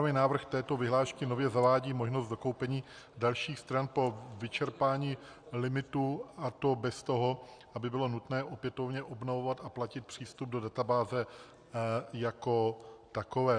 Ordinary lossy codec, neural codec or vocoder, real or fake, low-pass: Opus, 64 kbps; none; real; 9.9 kHz